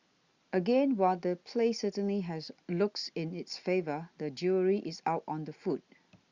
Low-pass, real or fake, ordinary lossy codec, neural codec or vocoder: 7.2 kHz; real; Opus, 64 kbps; none